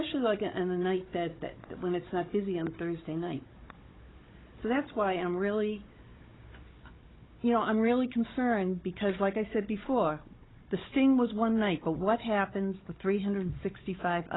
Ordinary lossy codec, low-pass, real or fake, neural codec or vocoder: AAC, 16 kbps; 7.2 kHz; fake; codec, 16 kHz, 16 kbps, FunCodec, trained on Chinese and English, 50 frames a second